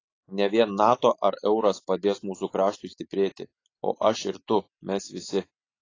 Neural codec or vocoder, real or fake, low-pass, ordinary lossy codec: none; real; 7.2 kHz; AAC, 32 kbps